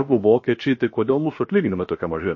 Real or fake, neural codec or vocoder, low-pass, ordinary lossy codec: fake; codec, 16 kHz, 0.7 kbps, FocalCodec; 7.2 kHz; MP3, 32 kbps